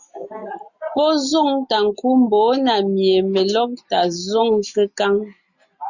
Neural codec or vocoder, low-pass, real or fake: none; 7.2 kHz; real